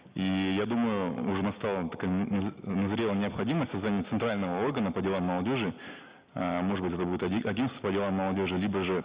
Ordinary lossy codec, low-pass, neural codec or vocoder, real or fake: Opus, 64 kbps; 3.6 kHz; none; real